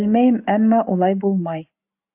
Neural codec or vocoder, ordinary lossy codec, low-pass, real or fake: none; AAC, 32 kbps; 3.6 kHz; real